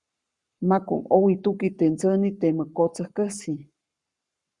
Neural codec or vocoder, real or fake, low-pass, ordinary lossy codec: codec, 44.1 kHz, 7.8 kbps, Pupu-Codec; fake; 10.8 kHz; Opus, 64 kbps